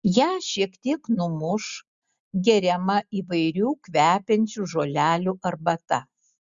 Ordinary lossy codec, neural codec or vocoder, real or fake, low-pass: Opus, 64 kbps; none; real; 7.2 kHz